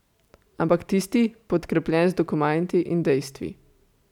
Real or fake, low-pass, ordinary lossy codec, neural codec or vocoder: real; 19.8 kHz; none; none